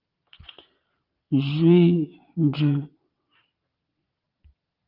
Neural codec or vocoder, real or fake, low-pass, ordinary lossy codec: vocoder, 22.05 kHz, 80 mel bands, Vocos; fake; 5.4 kHz; Opus, 24 kbps